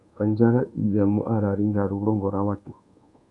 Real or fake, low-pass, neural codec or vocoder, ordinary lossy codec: fake; 10.8 kHz; codec, 24 kHz, 1.2 kbps, DualCodec; Opus, 64 kbps